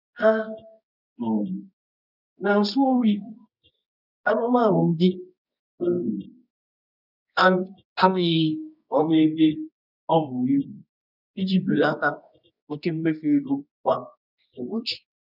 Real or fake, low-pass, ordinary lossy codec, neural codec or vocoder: fake; 5.4 kHz; none; codec, 24 kHz, 0.9 kbps, WavTokenizer, medium music audio release